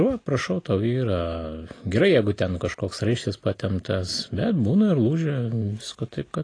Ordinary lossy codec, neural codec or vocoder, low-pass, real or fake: AAC, 32 kbps; none; 9.9 kHz; real